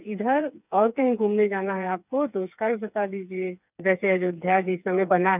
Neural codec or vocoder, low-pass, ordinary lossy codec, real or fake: codec, 16 kHz, 4 kbps, FreqCodec, smaller model; 3.6 kHz; none; fake